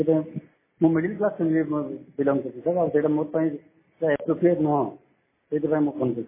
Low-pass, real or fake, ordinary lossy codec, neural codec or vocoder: 3.6 kHz; real; MP3, 16 kbps; none